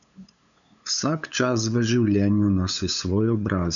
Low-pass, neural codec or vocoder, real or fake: 7.2 kHz; codec, 16 kHz, 8 kbps, FunCodec, trained on LibriTTS, 25 frames a second; fake